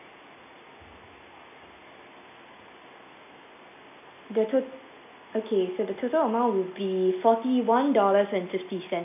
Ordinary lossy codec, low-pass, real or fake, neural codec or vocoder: AAC, 32 kbps; 3.6 kHz; real; none